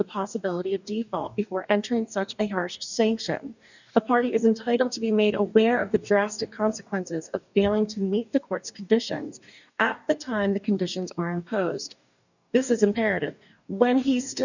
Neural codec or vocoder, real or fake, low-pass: codec, 44.1 kHz, 2.6 kbps, DAC; fake; 7.2 kHz